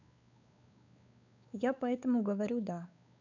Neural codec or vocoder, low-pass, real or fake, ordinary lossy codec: codec, 16 kHz, 4 kbps, X-Codec, WavLM features, trained on Multilingual LibriSpeech; 7.2 kHz; fake; none